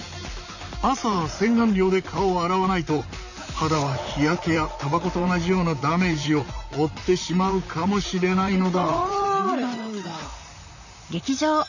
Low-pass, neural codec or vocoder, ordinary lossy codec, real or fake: 7.2 kHz; vocoder, 44.1 kHz, 128 mel bands, Pupu-Vocoder; none; fake